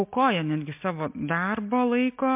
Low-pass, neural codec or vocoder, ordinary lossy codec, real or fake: 3.6 kHz; none; MP3, 32 kbps; real